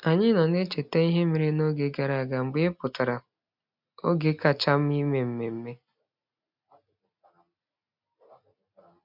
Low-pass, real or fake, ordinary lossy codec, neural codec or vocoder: 5.4 kHz; real; MP3, 48 kbps; none